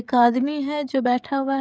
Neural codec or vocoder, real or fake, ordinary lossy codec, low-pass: codec, 16 kHz, 16 kbps, FreqCodec, smaller model; fake; none; none